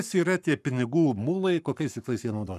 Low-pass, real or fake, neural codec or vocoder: 14.4 kHz; fake; codec, 44.1 kHz, 7.8 kbps, Pupu-Codec